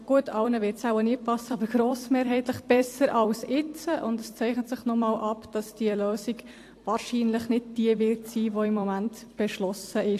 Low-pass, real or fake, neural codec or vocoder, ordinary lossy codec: 14.4 kHz; fake; vocoder, 44.1 kHz, 128 mel bands every 256 samples, BigVGAN v2; AAC, 64 kbps